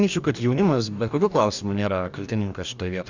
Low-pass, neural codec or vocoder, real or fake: 7.2 kHz; codec, 44.1 kHz, 2.6 kbps, DAC; fake